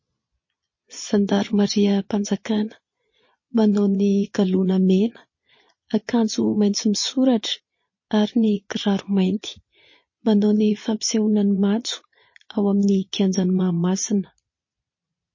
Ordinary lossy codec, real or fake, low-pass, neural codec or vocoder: MP3, 32 kbps; fake; 7.2 kHz; vocoder, 22.05 kHz, 80 mel bands, Vocos